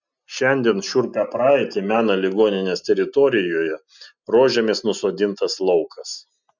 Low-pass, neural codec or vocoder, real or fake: 7.2 kHz; none; real